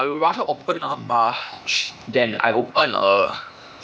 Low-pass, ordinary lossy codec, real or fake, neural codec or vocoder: none; none; fake; codec, 16 kHz, 0.8 kbps, ZipCodec